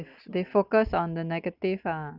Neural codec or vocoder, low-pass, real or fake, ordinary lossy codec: none; 5.4 kHz; real; none